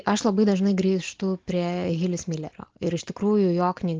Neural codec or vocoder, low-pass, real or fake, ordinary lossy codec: none; 7.2 kHz; real; Opus, 16 kbps